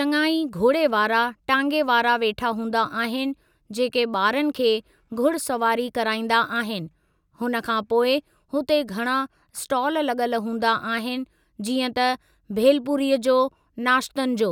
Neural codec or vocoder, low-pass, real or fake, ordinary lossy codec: none; 19.8 kHz; real; none